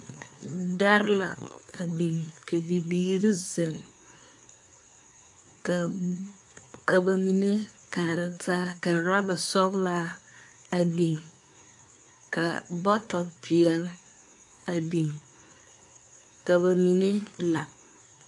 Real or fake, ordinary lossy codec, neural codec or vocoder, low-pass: fake; AAC, 64 kbps; codec, 24 kHz, 1 kbps, SNAC; 10.8 kHz